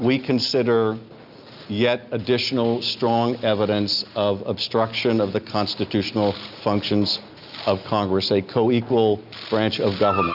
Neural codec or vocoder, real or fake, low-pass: none; real; 5.4 kHz